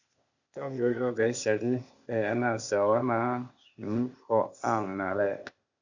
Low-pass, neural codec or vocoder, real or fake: 7.2 kHz; codec, 16 kHz, 0.8 kbps, ZipCodec; fake